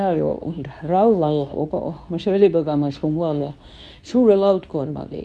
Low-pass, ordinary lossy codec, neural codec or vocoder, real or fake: none; none; codec, 24 kHz, 0.9 kbps, WavTokenizer, medium speech release version 2; fake